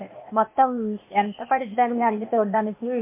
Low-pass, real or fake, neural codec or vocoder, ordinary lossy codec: 3.6 kHz; fake; codec, 16 kHz, 0.8 kbps, ZipCodec; MP3, 24 kbps